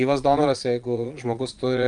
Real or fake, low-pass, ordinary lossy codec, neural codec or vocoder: fake; 9.9 kHz; Opus, 32 kbps; vocoder, 22.05 kHz, 80 mel bands, Vocos